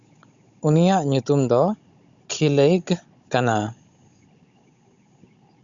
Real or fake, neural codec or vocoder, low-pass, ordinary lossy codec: fake; codec, 16 kHz, 16 kbps, FunCodec, trained on Chinese and English, 50 frames a second; 7.2 kHz; Opus, 64 kbps